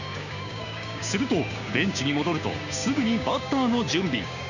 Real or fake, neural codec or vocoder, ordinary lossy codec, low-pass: fake; codec, 16 kHz, 6 kbps, DAC; none; 7.2 kHz